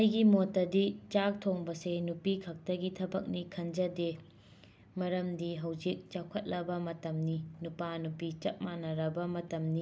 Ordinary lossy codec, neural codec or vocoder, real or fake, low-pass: none; none; real; none